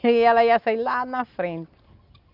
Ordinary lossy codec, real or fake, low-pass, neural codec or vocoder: none; real; 5.4 kHz; none